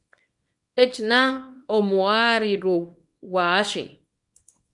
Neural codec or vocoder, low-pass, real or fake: codec, 24 kHz, 0.9 kbps, WavTokenizer, small release; 10.8 kHz; fake